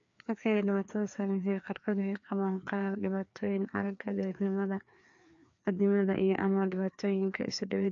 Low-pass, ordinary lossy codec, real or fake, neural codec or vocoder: 7.2 kHz; AAC, 64 kbps; fake; codec, 16 kHz, 2 kbps, FreqCodec, larger model